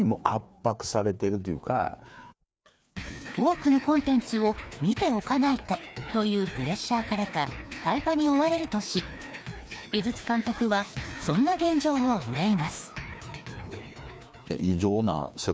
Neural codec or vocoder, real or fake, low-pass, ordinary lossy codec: codec, 16 kHz, 2 kbps, FreqCodec, larger model; fake; none; none